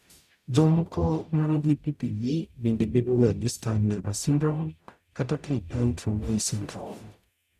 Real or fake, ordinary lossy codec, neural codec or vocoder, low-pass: fake; none; codec, 44.1 kHz, 0.9 kbps, DAC; 14.4 kHz